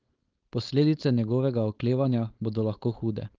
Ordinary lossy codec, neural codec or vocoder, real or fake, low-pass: Opus, 32 kbps; codec, 16 kHz, 4.8 kbps, FACodec; fake; 7.2 kHz